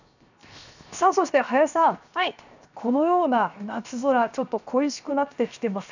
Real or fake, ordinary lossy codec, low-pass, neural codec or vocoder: fake; none; 7.2 kHz; codec, 16 kHz, 0.7 kbps, FocalCodec